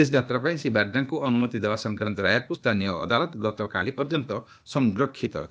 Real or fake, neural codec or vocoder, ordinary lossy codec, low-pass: fake; codec, 16 kHz, 0.8 kbps, ZipCodec; none; none